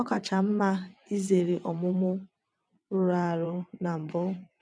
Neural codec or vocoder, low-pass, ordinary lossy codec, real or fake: vocoder, 22.05 kHz, 80 mel bands, WaveNeXt; none; none; fake